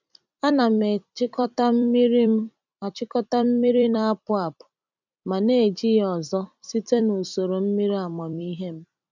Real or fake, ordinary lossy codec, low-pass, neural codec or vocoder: fake; none; 7.2 kHz; vocoder, 44.1 kHz, 128 mel bands every 256 samples, BigVGAN v2